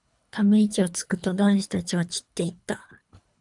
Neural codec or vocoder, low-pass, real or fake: codec, 24 kHz, 3 kbps, HILCodec; 10.8 kHz; fake